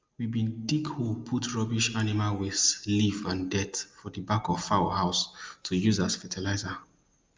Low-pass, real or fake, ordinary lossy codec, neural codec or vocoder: 7.2 kHz; real; Opus, 32 kbps; none